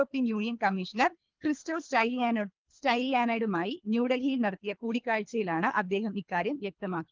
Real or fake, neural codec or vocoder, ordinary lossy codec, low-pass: fake; codec, 24 kHz, 3 kbps, HILCodec; Opus, 32 kbps; 7.2 kHz